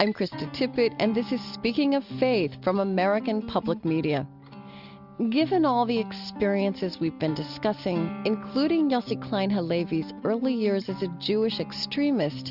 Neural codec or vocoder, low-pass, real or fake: none; 5.4 kHz; real